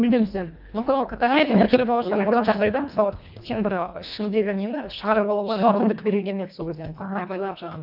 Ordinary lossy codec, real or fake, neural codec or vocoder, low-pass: none; fake; codec, 24 kHz, 1.5 kbps, HILCodec; 5.4 kHz